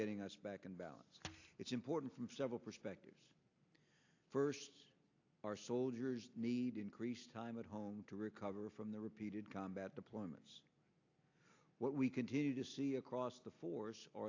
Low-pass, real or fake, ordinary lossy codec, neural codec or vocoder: 7.2 kHz; real; AAC, 48 kbps; none